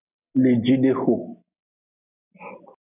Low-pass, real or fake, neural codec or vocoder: 3.6 kHz; real; none